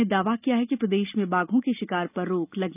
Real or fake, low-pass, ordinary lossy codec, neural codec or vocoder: real; 3.6 kHz; none; none